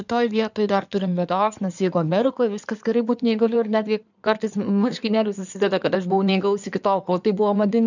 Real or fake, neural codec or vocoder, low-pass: fake; codec, 16 kHz in and 24 kHz out, 2.2 kbps, FireRedTTS-2 codec; 7.2 kHz